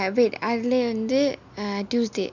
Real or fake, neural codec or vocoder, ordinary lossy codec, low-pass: real; none; none; 7.2 kHz